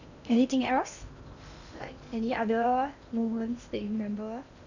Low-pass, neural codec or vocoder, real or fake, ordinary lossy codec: 7.2 kHz; codec, 16 kHz in and 24 kHz out, 0.8 kbps, FocalCodec, streaming, 65536 codes; fake; none